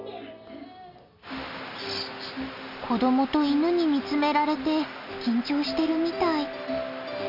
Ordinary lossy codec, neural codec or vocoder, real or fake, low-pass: none; none; real; 5.4 kHz